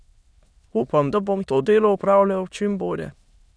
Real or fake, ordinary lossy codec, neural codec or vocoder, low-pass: fake; none; autoencoder, 22.05 kHz, a latent of 192 numbers a frame, VITS, trained on many speakers; none